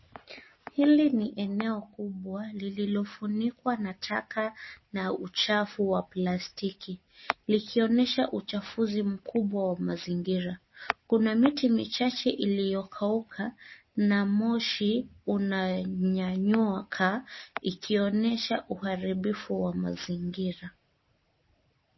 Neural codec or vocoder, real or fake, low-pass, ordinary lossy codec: none; real; 7.2 kHz; MP3, 24 kbps